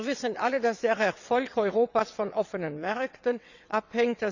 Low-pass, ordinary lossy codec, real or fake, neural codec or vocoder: 7.2 kHz; none; fake; vocoder, 22.05 kHz, 80 mel bands, WaveNeXt